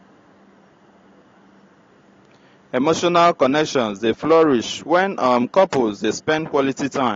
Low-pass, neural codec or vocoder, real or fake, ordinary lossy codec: 7.2 kHz; none; real; AAC, 32 kbps